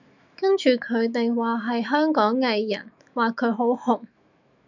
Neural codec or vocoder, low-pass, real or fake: autoencoder, 48 kHz, 128 numbers a frame, DAC-VAE, trained on Japanese speech; 7.2 kHz; fake